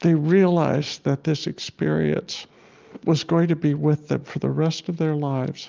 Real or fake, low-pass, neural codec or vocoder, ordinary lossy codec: real; 7.2 kHz; none; Opus, 32 kbps